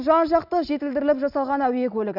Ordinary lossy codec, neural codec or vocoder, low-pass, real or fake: none; none; 5.4 kHz; real